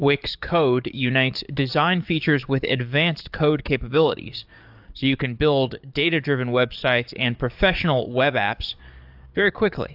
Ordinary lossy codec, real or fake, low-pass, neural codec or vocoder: AAC, 48 kbps; fake; 5.4 kHz; codec, 44.1 kHz, 7.8 kbps, DAC